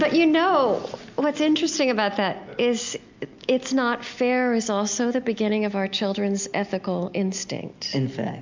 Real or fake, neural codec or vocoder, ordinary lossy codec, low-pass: real; none; MP3, 64 kbps; 7.2 kHz